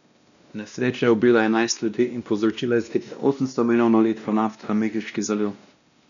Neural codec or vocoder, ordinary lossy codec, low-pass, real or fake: codec, 16 kHz, 1 kbps, X-Codec, WavLM features, trained on Multilingual LibriSpeech; none; 7.2 kHz; fake